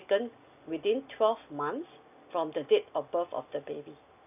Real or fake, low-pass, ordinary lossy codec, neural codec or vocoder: real; 3.6 kHz; none; none